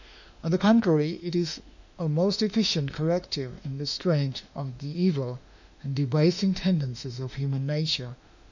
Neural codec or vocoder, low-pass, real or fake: autoencoder, 48 kHz, 32 numbers a frame, DAC-VAE, trained on Japanese speech; 7.2 kHz; fake